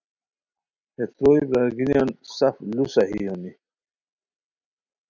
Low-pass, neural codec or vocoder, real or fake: 7.2 kHz; none; real